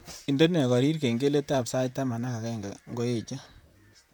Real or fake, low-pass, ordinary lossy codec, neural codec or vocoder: fake; none; none; vocoder, 44.1 kHz, 128 mel bands, Pupu-Vocoder